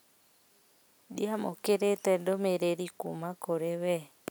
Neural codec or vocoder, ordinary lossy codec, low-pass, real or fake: none; none; none; real